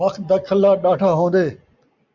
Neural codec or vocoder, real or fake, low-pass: none; real; 7.2 kHz